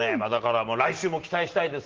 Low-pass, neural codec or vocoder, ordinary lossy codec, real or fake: 7.2 kHz; none; Opus, 16 kbps; real